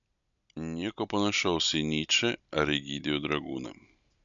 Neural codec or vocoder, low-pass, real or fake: none; 7.2 kHz; real